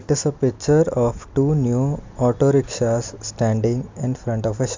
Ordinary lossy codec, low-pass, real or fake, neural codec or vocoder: MP3, 64 kbps; 7.2 kHz; real; none